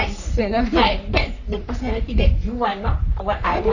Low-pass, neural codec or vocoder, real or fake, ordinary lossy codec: 7.2 kHz; codec, 44.1 kHz, 3.4 kbps, Pupu-Codec; fake; none